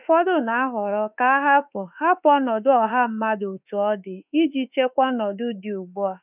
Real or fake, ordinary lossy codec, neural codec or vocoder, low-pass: fake; none; autoencoder, 48 kHz, 32 numbers a frame, DAC-VAE, trained on Japanese speech; 3.6 kHz